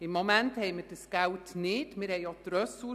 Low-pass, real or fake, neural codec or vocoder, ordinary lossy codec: 14.4 kHz; real; none; none